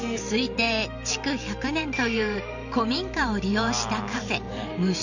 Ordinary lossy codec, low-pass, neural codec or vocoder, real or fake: none; 7.2 kHz; vocoder, 44.1 kHz, 128 mel bands every 512 samples, BigVGAN v2; fake